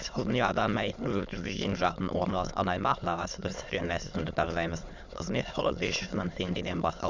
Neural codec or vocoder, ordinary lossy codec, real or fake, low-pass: autoencoder, 22.05 kHz, a latent of 192 numbers a frame, VITS, trained on many speakers; Opus, 64 kbps; fake; 7.2 kHz